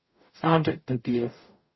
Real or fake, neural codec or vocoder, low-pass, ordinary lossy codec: fake; codec, 44.1 kHz, 0.9 kbps, DAC; 7.2 kHz; MP3, 24 kbps